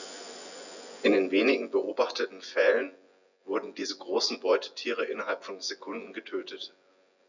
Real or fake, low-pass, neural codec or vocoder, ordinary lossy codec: fake; 7.2 kHz; vocoder, 24 kHz, 100 mel bands, Vocos; none